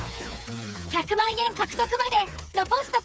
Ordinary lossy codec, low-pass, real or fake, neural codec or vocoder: none; none; fake; codec, 16 kHz, 4 kbps, FreqCodec, smaller model